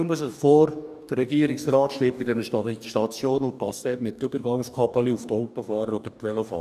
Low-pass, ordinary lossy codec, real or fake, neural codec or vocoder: 14.4 kHz; none; fake; codec, 44.1 kHz, 2.6 kbps, DAC